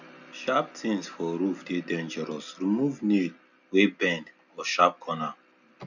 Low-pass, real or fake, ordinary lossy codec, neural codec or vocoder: 7.2 kHz; real; none; none